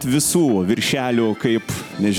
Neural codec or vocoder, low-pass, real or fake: none; 19.8 kHz; real